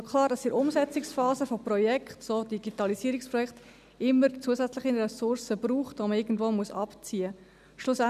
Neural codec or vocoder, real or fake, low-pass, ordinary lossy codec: none; real; 14.4 kHz; none